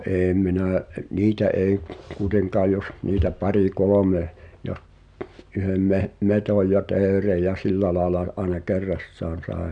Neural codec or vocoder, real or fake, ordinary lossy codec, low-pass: none; real; MP3, 96 kbps; 9.9 kHz